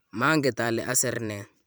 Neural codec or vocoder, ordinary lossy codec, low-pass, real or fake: vocoder, 44.1 kHz, 128 mel bands every 512 samples, BigVGAN v2; none; none; fake